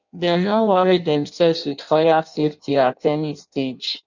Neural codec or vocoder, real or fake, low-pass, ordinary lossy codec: codec, 16 kHz in and 24 kHz out, 0.6 kbps, FireRedTTS-2 codec; fake; 7.2 kHz; none